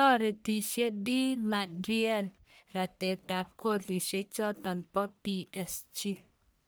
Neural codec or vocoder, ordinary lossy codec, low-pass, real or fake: codec, 44.1 kHz, 1.7 kbps, Pupu-Codec; none; none; fake